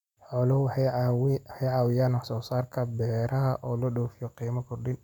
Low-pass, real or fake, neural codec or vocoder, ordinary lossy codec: 19.8 kHz; real; none; none